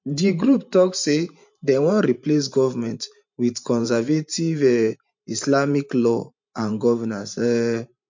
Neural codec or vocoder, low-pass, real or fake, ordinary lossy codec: vocoder, 44.1 kHz, 128 mel bands every 512 samples, BigVGAN v2; 7.2 kHz; fake; MP3, 48 kbps